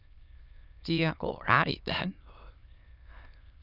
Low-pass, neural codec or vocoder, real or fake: 5.4 kHz; autoencoder, 22.05 kHz, a latent of 192 numbers a frame, VITS, trained on many speakers; fake